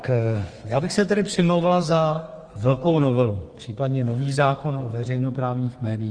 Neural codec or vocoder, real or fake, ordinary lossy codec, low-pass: codec, 32 kHz, 1.9 kbps, SNAC; fake; Opus, 24 kbps; 9.9 kHz